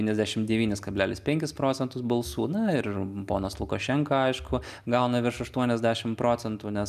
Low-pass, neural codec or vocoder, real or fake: 14.4 kHz; none; real